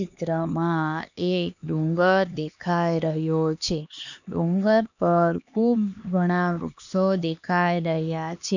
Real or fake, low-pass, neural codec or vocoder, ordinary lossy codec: fake; 7.2 kHz; codec, 16 kHz, 2 kbps, X-Codec, HuBERT features, trained on LibriSpeech; none